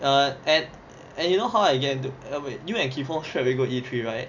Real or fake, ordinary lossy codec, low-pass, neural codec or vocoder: real; none; 7.2 kHz; none